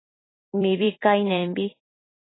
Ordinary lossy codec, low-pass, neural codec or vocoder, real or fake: AAC, 16 kbps; 7.2 kHz; vocoder, 44.1 kHz, 128 mel bands every 256 samples, BigVGAN v2; fake